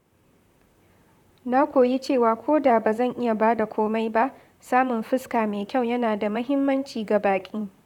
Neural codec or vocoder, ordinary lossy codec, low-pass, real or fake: vocoder, 44.1 kHz, 128 mel bands, Pupu-Vocoder; none; 19.8 kHz; fake